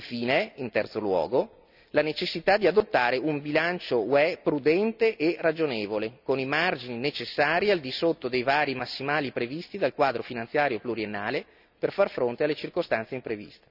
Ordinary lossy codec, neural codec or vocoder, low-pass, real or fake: none; none; 5.4 kHz; real